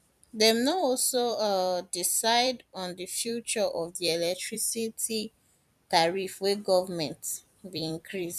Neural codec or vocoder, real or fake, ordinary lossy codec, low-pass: vocoder, 44.1 kHz, 128 mel bands every 256 samples, BigVGAN v2; fake; none; 14.4 kHz